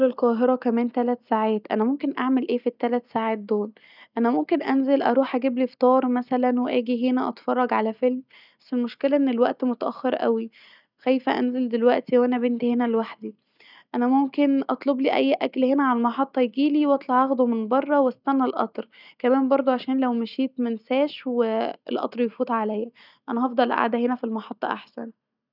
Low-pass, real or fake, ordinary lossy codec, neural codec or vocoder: 5.4 kHz; real; none; none